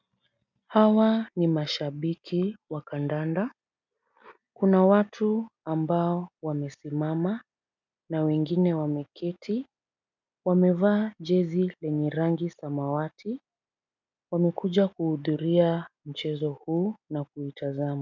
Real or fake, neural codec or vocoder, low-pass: real; none; 7.2 kHz